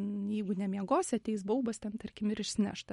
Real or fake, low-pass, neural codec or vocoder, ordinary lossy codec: real; 19.8 kHz; none; MP3, 48 kbps